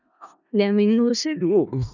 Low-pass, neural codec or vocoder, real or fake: 7.2 kHz; codec, 16 kHz in and 24 kHz out, 0.4 kbps, LongCat-Audio-Codec, four codebook decoder; fake